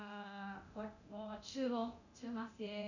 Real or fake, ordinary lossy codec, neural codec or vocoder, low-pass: fake; none; codec, 24 kHz, 0.9 kbps, DualCodec; 7.2 kHz